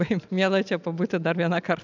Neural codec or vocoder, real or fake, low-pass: none; real; 7.2 kHz